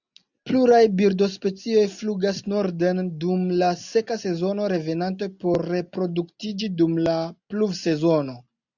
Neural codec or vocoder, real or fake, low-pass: none; real; 7.2 kHz